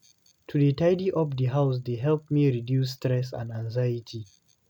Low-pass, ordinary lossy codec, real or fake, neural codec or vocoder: 19.8 kHz; none; real; none